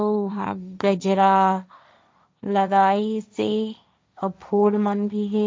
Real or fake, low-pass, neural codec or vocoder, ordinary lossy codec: fake; none; codec, 16 kHz, 1.1 kbps, Voila-Tokenizer; none